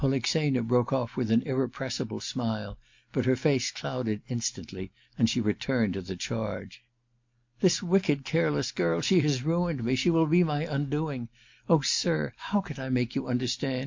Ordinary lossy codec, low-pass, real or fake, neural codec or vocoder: MP3, 48 kbps; 7.2 kHz; real; none